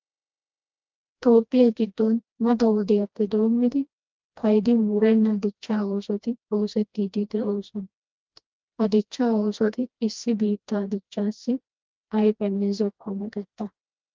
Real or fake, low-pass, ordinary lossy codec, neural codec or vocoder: fake; 7.2 kHz; Opus, 24 kbps; codec, 16 kHz, 1 kbps, FreqCodec, smaller model